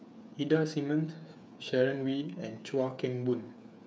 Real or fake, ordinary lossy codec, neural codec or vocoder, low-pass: fake; none; codec, 16 kHz, 8 kbps, FreqCodec, smaller model; none